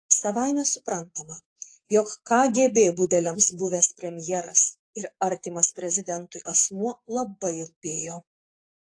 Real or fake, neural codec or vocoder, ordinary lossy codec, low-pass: fake; codec, 44.1 kHz, 7.8 kbps, DAC; AAC, 48 kbps; 9.9 kHz